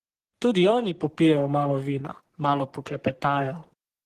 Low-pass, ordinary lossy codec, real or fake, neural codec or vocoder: 14.4 kHz; Opus, 16 kbps; fake; codec, 44.1 kHz, 3.4 kbps, Pupu-Codec